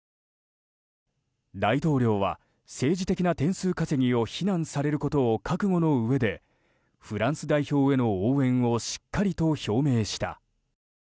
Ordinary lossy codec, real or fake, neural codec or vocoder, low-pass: none; real; none; none